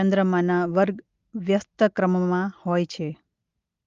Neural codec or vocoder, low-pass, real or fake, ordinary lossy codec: none; 7.2 kHz; real; Opus, 32 kbps